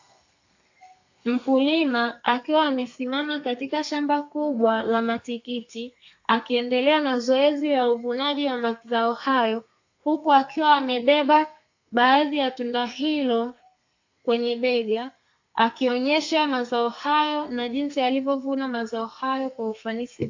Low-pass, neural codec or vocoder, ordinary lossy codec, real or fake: 7.2 kHz; codec, 32 kHz, 1.9 kbps, SNAC; AAC, 48 kbps; fake